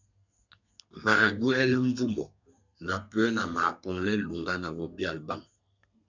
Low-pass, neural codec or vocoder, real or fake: 7.2 kHz; codec, 32 kHz, 1.9 kbps, SNAC; fake